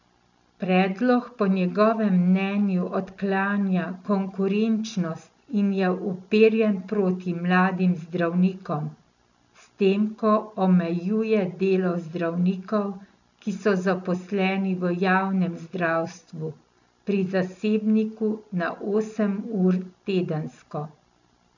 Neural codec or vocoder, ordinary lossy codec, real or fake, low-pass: none; none; real; 7.2 kHz